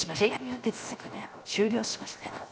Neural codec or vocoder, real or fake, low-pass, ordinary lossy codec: codec, 16 kHz, 0.7 kbps, FocalCodec; fake; none; none